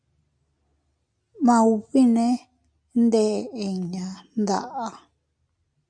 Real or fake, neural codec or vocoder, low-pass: real; none; 9.9 kHz